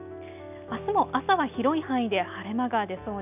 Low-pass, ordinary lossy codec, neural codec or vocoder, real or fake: 3.6 kHz; none; none; real